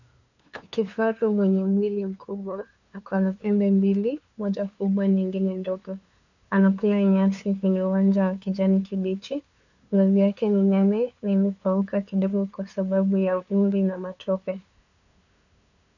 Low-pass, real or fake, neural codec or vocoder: 7.2 kHz; fake; codec, 16 kHz, 2 kbps, FunCodec, trained on LibriTTS, 25 frames a second